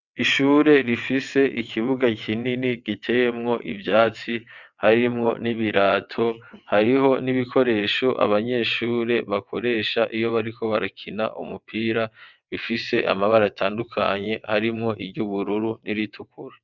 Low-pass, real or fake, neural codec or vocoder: 7.2 kHz; fake; vocoder, 22.05 kHz, 80 mel bands, WaveNeXt